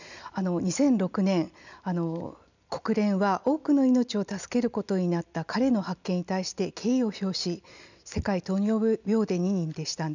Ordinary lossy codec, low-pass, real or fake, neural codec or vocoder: none; 7.2 kHz; real; none